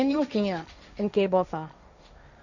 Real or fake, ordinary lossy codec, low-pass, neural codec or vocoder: fake; none; 7.2 kHz; codec, 16 kHz, 1.1 kbps, Voila-Tokenizer